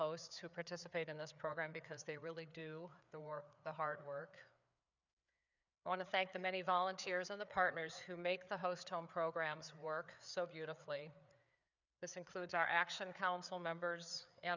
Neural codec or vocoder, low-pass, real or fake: codec, 16 kHz, 4 kbps, FreqCodec, larger model; 7.2 kHz; fake